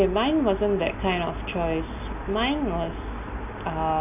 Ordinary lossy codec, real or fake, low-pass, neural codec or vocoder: none; real; 3.6 kHz; none